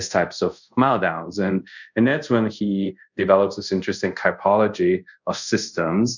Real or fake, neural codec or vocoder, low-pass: fake; codec, 24 kHz, 0.5 kbps, DualCodec; 7.2 kHz